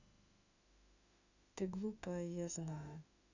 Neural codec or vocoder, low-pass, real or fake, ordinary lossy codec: autoencoder, 48 kHz, 32 numbers a frame, DAC-VAE, trained on Japanese speech; 7.2 kHz; fake; none